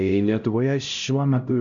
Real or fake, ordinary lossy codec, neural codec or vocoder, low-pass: fake; MP3, 96 kbps; codec, 16 kHz, 0.5 kbps, X-Codec, HuBERT features, trained on LibriSpeech; 7.2 kHz